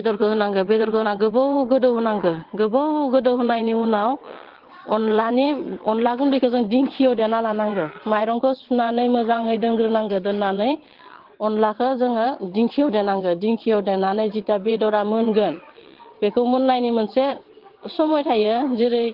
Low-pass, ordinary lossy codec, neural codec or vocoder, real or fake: 5.4 kHz; Opus, 16 kbps; vocoder, 22.05 kHz, 80 mel bands, WaveNeXt; fake